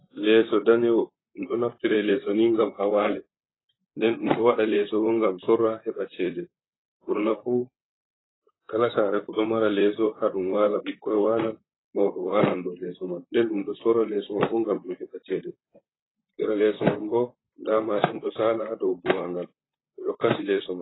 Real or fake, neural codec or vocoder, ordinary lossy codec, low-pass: fake; vocoder, 44.1 kHz, 128 mel bands, Pupu-Vocoder; AAC, 16 kbps; 7.2 kHz